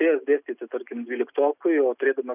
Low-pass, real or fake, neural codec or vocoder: 3.6 kHz; real; none